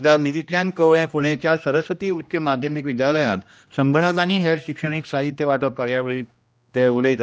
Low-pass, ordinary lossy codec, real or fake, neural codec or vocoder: none; none; fake; codec, 16 kHz, 1 kbps, X-Codec, HuBERT features, trained on general audio